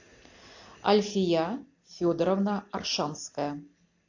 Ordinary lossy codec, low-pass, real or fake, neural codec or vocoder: AAC, 48 kbps; 7.2 kHz; real; none